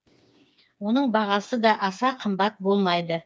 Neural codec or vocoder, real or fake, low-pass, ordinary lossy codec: codec, 16 kHz, 4 kbps, FreqCodec, smaller model; fake; none; none